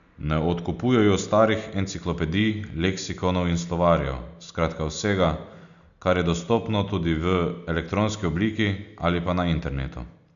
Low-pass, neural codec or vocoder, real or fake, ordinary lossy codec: 7.2 kHz; none; real; none